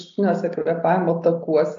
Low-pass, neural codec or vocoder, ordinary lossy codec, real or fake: 7.2 kHz; none; AAC, 48 kbps; real